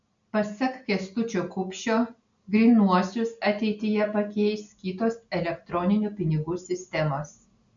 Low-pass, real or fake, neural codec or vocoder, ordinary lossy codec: 7.2 kHz; real; none; MP3, 64 kbps